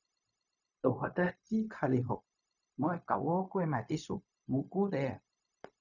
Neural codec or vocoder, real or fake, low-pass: codec, 16 kHz, 0.4 kbps, LongCat-Audio-Codec; fake; 7.2 kHz